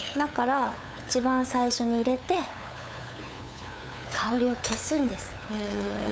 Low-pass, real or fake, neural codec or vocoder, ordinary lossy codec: none; fake; codec, 16 kHz, 8 kbps, FunCodec, trained on LibriTTS, 25 frames a second; none